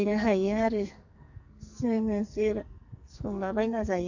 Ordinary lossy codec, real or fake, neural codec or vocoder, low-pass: none; fake; codec, 44.1 kHz, 2.6 kbps, SNAC; 7.2 kHz